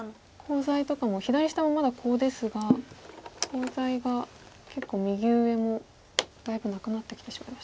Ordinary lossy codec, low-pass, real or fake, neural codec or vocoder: none; none; real; none